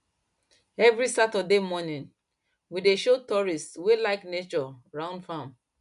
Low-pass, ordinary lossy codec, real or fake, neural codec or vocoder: 10.8 kHz; none; real; none